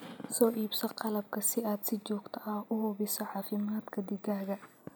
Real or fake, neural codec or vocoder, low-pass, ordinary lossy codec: real; none; none; none